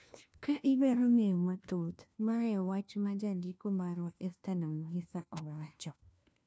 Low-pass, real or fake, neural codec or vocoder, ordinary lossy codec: none; fake; codec, 16 kHz, 1 kbps, FunCodec, trained on LibriTTS, 50 frames a second; none